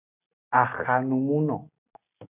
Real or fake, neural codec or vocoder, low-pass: real; none; 3.6 kHz